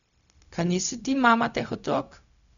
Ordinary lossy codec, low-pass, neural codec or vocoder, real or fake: none; 7.2 kHz; codec, 16 kHz, 0.4 kbps, LongCat-Audio-Codec; fake